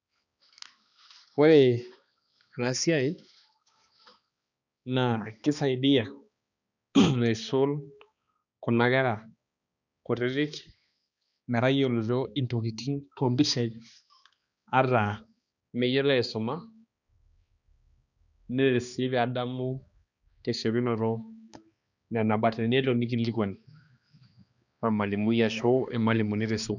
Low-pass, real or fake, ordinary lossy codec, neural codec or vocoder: 7.2 kHz; fake; none; codec, 16 kHz, 2 kbps, X-Codec, HuBERT features, trained on balanced general audio